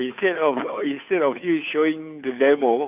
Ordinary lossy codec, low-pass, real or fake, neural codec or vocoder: none; 3.6 kHz; fake; codec, 16 kHz, 2 kbps, FunCodec, trained on Chinese and English, 25 frames a second